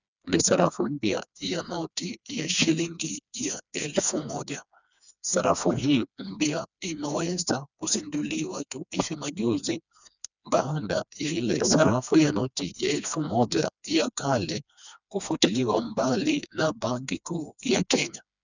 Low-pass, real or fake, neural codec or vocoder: 7.2 kHz; fake; codec, 16 kHz, 2 kbps, FreqCodec, smaller model